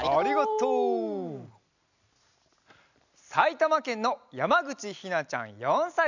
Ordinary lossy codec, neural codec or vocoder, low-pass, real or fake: none; none; 7.2 kHz; real